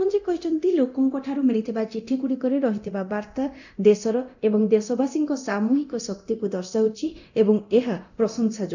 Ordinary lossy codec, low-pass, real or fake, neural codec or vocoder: none; 7.2 kHz; fake; codec, 24 kHz, 0.9 kbps, DualCodec